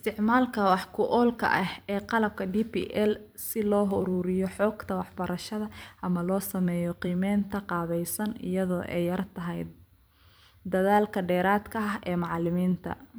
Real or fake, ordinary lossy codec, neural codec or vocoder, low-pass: fake; none; vocoder, 44.1 kHz, 128 mel bands every 256 samples, BigVGAN v2; none